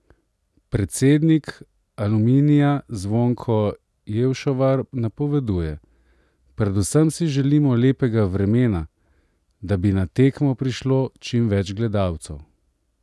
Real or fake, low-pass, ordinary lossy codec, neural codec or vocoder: real; none; none; none